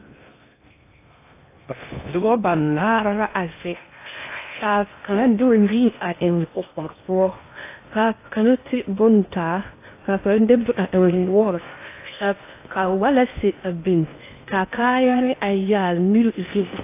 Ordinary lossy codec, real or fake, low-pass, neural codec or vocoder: AAC, 24 kbps; fake; 3.6 kHz; codec, 16 kHz in and 24 kHz out, 0.6 kbps, FocalCodec, streaming, 2048 codes